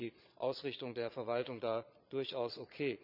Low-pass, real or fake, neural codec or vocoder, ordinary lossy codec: 5.4 kHz; fake; vocoder, 22.05 kHz, 80 mel bands, Vocos; none